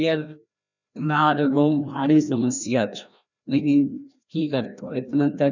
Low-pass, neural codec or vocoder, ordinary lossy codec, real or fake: 7.2 kHz; codec, 16 kHz, 1 kbps, FreqCodec, larger model; none; fake